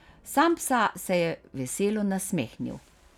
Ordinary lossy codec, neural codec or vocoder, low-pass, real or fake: none; none; 19.8 kHz; real